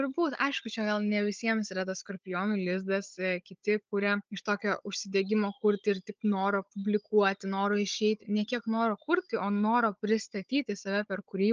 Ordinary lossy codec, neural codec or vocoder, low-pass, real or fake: Opus, 24 kbps; codec, 16 kHz, 16 kbps, FunCodec, trained on Chinese and English, 50 frames a second; 7.2 kHz; fake